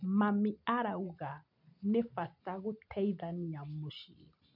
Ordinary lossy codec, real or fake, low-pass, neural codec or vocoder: none; real; 5.4 kHz; none